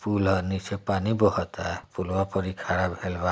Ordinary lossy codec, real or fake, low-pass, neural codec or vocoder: none; real; none; none